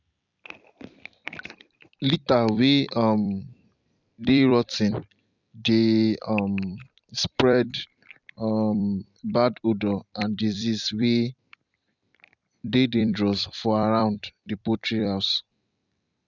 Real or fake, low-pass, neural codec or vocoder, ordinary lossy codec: fake; 7.2 kHz; vocoder, 44.1 kHz, 128 mel bands every 256 samples, BigVGAN v2; none